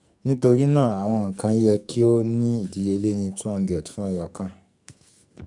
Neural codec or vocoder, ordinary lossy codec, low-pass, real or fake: codec, 44.1 kHz, 2.6 kbps, SNAC; none; 10.8 kHz; fake